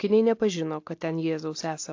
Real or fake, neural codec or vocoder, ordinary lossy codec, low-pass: real; none; AAC, 48 kbps; 7.2 kHz